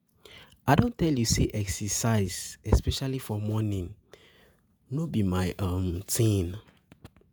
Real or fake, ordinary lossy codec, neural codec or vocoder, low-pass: fake; none; vocoder, 48 kHz, 128 mel bands, Vocos; none